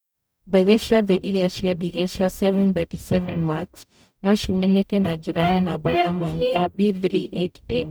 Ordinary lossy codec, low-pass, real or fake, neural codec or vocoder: none; none; fake; codec, 44.1 kHz, 0.9 kbps, DAC